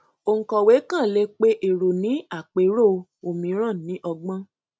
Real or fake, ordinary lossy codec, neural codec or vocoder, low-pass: real; none; none; none